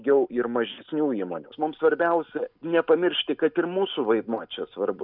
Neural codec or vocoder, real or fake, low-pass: none; real; 5.4 kHz